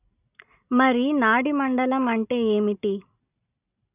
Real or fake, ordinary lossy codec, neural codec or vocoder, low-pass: real; none; none; 3.6 kHz